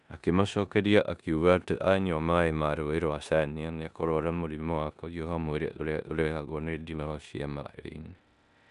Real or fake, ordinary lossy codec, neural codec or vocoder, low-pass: fake; none; codec, 16 kHz in and 24 kHz out, 0.9 kbps, LongCat-Audio-Codec, four codebook decoder; 10.8 kHz